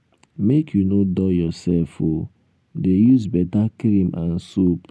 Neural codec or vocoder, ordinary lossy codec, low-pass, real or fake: none; none; none; real